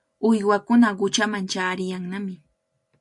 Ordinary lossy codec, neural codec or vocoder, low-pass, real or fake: MP3, 48 kbps; none; 10.8 kHz; real